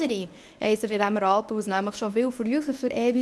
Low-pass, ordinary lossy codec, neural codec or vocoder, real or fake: none; none; codec, 24 kHz, 0.9 kbps, WavTokenizer, medium speech release version 1; fake